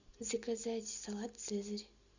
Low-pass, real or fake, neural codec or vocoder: 7.2 kHz; real; none